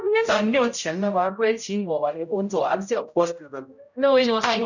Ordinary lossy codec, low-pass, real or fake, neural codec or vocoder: none; 7.2 kHz; fake; codec, 16 kHz, 0.5 kbps, X-Codec, HuBERT features, trained on general audio